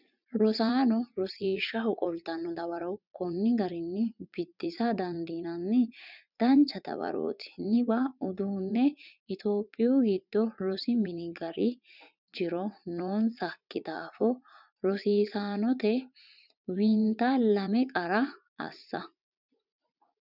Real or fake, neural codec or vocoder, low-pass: fake; vocoder, 44.1 kHz, 80 mel bands, Vocos; 5.4 kHz